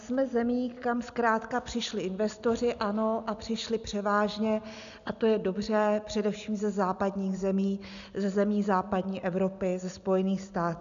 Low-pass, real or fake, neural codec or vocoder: 7.2 kHz; real; none